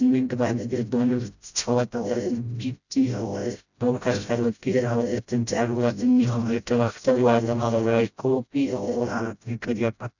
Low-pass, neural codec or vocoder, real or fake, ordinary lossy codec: 7.2 kHz; codec, 16 kHz, 0.5 kbps, FreqCodec, smaller model; fake; none